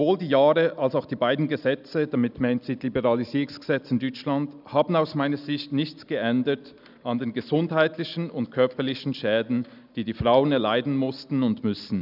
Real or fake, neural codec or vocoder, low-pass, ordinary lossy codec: real; none; 5.4 kHz; none